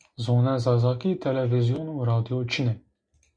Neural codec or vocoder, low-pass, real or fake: none; 9.9 kHz; real